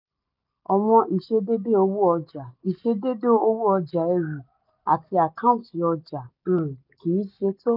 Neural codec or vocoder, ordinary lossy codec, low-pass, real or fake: none; none; 5.4 kHz; real